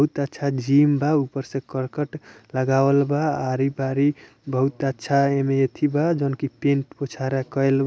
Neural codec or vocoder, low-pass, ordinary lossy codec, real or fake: none; none; none; real